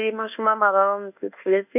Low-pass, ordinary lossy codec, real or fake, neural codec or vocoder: 3.6 kHz; none; fake; codec, 24 kHz, 1.2 kbps, DualCodec